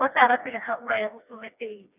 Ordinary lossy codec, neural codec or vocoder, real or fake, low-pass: none; codec, 16 kHz, 2 kbps, FreqCodec, smaller model; fake; 3.6 kHz